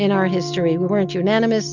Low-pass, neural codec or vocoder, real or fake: 7.2 kHz; none; real